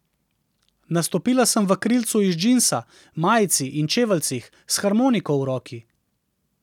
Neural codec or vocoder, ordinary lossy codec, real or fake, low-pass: none; none; real; 19.8 kHz